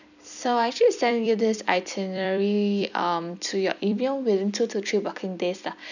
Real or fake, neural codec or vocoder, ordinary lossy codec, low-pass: fake; vocoder, 44.1 kHz, 128 mel bands every 256 samples, BigVGAN v2; none; 7.2 kHz